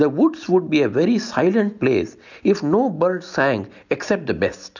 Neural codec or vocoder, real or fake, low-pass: none; real; 7.2 kHz